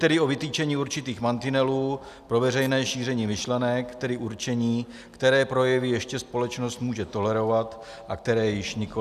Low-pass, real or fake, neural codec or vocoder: 14.4 kHz; real; none